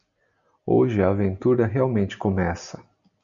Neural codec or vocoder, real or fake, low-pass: none; real; 7.2 kHz